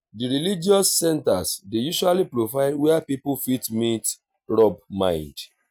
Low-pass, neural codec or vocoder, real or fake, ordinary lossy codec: none; none; real; none